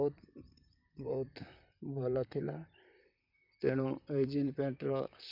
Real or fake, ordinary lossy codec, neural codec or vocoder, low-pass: fake; none; vocoder, 44.1 kHz, 128 mel bands, Pupu-Vocoder; 5.4 kHz